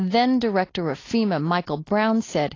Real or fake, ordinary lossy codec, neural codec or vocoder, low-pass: real; AAC, 32 kbps; none; 7.2 kHz